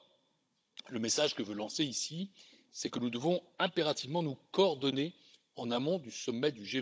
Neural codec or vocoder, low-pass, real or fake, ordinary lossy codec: codec, 16 kHz, 16 kbps, FunCodec, trained on Chinese and English, 50 frames a second; none; fake; none